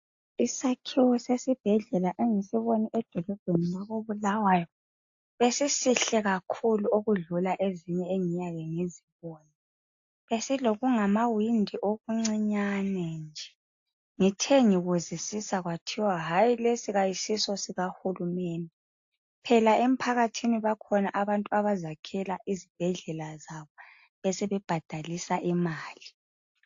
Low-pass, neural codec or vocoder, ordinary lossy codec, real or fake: 7.2 kHz; none; AAC, 48 kbps; real